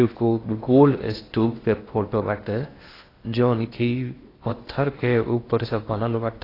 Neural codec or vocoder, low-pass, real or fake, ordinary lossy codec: codec, 16 kHz in and 24 kHz out, 0.6 kbps, FocalCodec, streaming, 4096 codes; 5.4 kHz; fake; AAC, 24 kbps